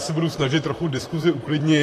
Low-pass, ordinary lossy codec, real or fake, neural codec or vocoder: 14.4 kHz; AAC, 48 kbps; fake; vocoder, 44.1 kHz, 128 mel bands, Pupu-Vocoder